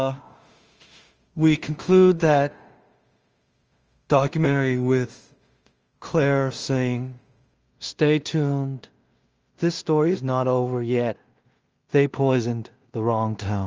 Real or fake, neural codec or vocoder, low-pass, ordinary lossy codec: fake; codec, 16 kHz in and 24 kHz out, 0.4 kbps, LongCat-Audio-Codec, two codebook decoder; 7.2 kHz; Opus, 24 kbps